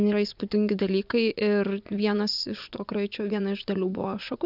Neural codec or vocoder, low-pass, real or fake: vocoder, 24 kHz, 100 mel bands, Vocos; 5.4 kHz; fake